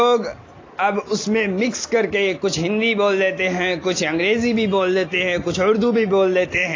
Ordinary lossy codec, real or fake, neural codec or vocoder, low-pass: AAC, 32 kbps; real; none; 7.2 kHz